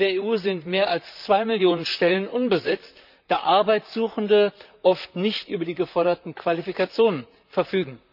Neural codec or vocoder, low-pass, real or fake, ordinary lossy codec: vocoder, 44.1 kHz, 128 mel bands, Pupu-Vocoder; 5.4 kHz; fake; none